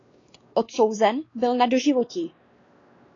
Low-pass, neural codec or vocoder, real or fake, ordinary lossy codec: 7.2 kHz; codec, 16 kHz, 2 kbps, X-Codec, WavLM features, trained on Multilingual LibriSpeech; fake; AAC, 32 kbps